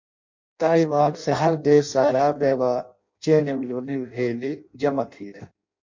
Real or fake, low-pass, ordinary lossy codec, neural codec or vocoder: fake; 7.2 kHz; MP3, 48 kbps; codec, 16 kHz in and 24 kHz out, 0.6 kbps, FireRedTTS-2 codec